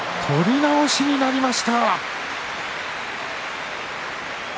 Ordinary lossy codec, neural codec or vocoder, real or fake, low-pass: none; none; real; none